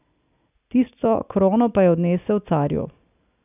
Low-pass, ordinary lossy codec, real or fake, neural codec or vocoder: 3.6 kHz; none; real; none